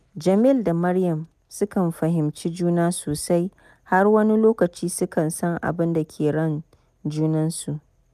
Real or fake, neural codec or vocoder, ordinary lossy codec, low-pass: real; none; none; 14.4 kHz